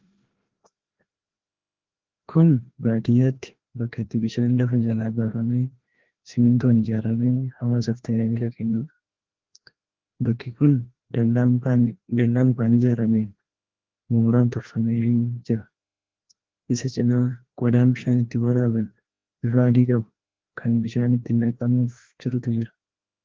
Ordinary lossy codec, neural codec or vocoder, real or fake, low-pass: Opus, 16 kbps; codec, 16 kHz, 1 kbps, FreqCodec, larger model; fake; 7.2 kHz